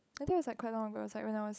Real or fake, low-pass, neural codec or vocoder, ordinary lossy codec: fake; none; codec, 16 kHz, 16 kbps, FunCodec, trained on LibriTTS, 50 frames a second; none